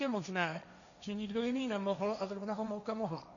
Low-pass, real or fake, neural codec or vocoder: 7.2 kHz; fake; codec, 16 kHz, 1.1 kbps, Voila-Tokenizer